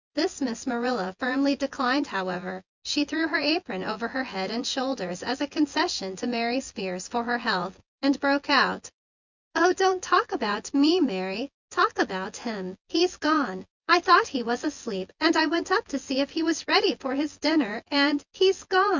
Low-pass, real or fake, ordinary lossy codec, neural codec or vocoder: 7.2 kHz; fake; Opus, 64 kbps; vocoder, 24 kHz, 100 mel bands, Vocos